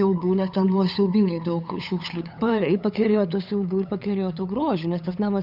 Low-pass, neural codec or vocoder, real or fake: 5.4 kHz; codec, 16 kHz, 8 kbps, FunCodec, trained on LibriTTS, 25 frames a second; fake